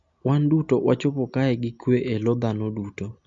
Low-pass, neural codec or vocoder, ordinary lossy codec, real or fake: 7.2 kHz; none; MP3, 48 kbps; real